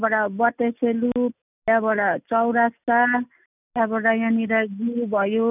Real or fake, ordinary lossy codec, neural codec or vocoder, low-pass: real; none; none; 3.6 kHz